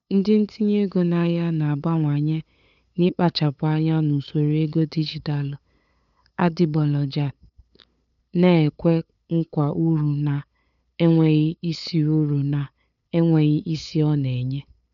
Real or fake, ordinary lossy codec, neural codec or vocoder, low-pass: fake; none; codec, 16 kHz, 8 kbps, FunCodec, trained on LibriTTS, 25 frames a second; 7.2 kHz